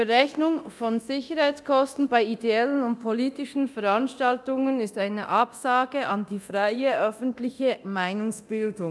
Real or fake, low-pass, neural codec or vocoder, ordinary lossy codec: fake; none; codec, 24 kHz, 0.9 kbps, DualCodec; none